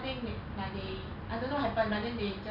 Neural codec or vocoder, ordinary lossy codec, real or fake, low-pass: none; none; real; 5.4 kHz